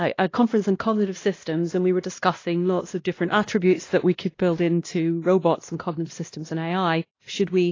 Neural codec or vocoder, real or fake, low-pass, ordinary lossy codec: codec, 16 kHz, 1 kbps, X-Codec, WavLM features, trained on Multilingual LibriSpeech; fake; 7.2 kHz; AAC, 32 kbps